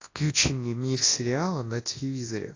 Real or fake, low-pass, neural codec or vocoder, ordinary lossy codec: fake; 7.2 kHz; codec, 24 kHz, 0.9 kbps, WavTokenizer, large speech release; AAC, 32 kbps